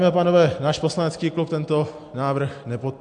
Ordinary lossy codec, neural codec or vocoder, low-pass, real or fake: AAC, 64 kbps; none; 9.9 kHz; real